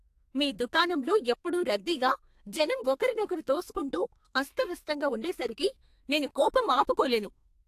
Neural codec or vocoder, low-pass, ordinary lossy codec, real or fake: codec, 32 kHz, 1.9 kbps, SNAC; 14.4 kHz; AAC, 64 kbps; fake